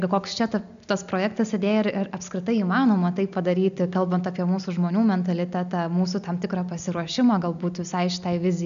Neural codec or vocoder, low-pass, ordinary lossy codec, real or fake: none; 7.2 kHz; MP3, 96 kbps; real